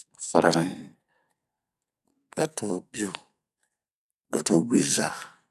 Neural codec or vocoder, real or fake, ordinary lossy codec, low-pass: codec, 44.1 kHz, 2.6 kbps, SNAC; fake; none; 14.4 kHz